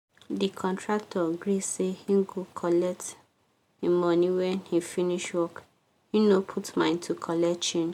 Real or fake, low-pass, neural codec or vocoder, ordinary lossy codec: real; 19.8 kHz; none; MP3, 96 kbps